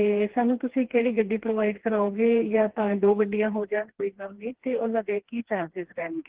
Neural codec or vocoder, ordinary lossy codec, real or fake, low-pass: codec, 16 kHz, 2 kbps, FreqCodec, smaller model; Opus, 16 kbps; fake; 3.6 kHz